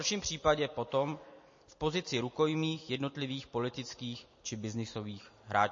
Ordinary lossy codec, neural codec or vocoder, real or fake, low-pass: MP3, 32 kbps; none; real; 7.2 kHz